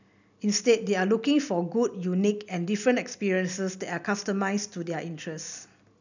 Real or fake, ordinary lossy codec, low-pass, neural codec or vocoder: real; none; 7.2 kHz; none